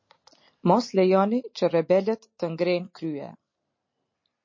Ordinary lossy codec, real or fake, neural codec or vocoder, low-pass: MP3, 32 kbps; real; none; 7.2 kHz